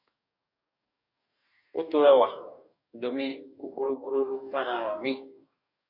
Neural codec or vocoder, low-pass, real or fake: codec, 44.1 kHz, 2.6 kbps, DAC; 5.4 kHz; fake